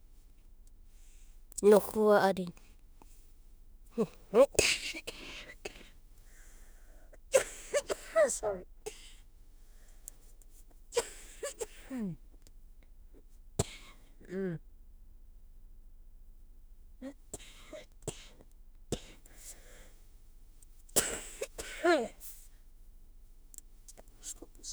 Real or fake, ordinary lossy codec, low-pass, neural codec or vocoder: fake; none; none; autoencoder, 48 kHz, 32 numbers a frame, DAC-VAE, trained on Japanese speech